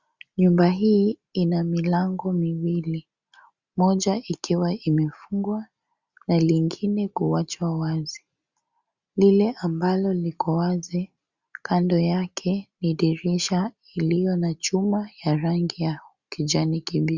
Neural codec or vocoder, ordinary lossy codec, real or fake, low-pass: none; Opus, 64 kbps; real; 7.2 kHz